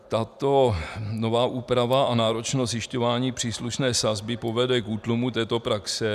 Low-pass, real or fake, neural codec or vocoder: 14.4 kHz; real; none